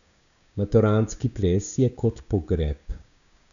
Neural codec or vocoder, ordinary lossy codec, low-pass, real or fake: codec, 16 kHz, 6 kbps, DAC; none; 7.2 kHz; fake